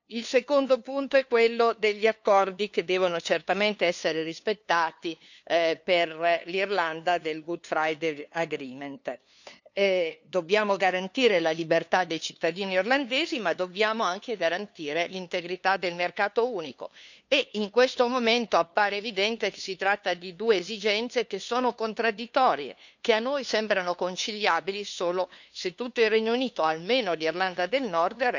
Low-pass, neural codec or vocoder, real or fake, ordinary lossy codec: 7.2 kHz; codec, 16 kHz, 2 kbps, FunCodec, trained on LibriTTS, 25 frames a second; fake; none